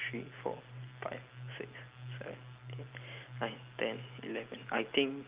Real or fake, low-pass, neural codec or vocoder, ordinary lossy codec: real; 3.6 kHz; none; Opus, 24 kbps